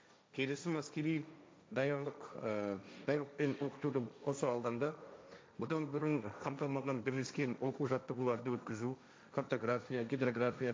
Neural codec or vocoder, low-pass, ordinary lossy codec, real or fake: codec, 16 kHz, 1.1 kbps, Voila-Tokenizer; none; none; fake